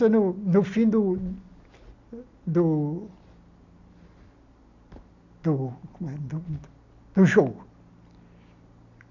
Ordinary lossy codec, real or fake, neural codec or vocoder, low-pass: none; real; none; 7.2 kHz